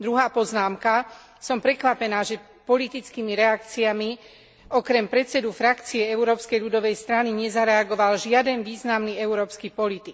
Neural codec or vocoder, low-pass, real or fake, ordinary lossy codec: none; none; real; none